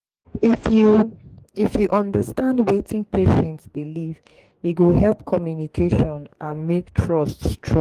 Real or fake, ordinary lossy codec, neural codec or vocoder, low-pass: fake; Opus, 24 kbps; codec, 44.1 kHz, 2.6 kbps, DAC; 14.4 kHz